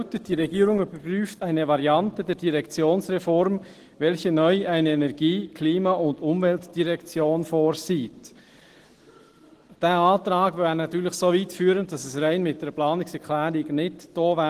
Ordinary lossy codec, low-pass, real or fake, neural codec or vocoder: Opus, 16 kbps; 14.4 kHz; real; none